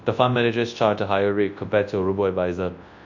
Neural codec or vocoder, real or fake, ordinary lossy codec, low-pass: codec, 24 kHz, 0.9 kbps, WavTokenizer, large speech release; fake; MP3, 48 kbps; 7.2 kHz